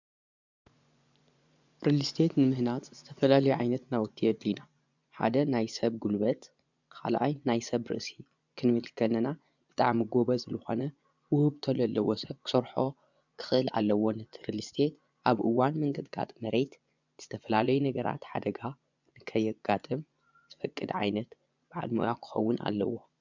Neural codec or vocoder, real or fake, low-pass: vocoder, 24 kHz, 100 mel bands, Vocos; fake; 7.2 kHz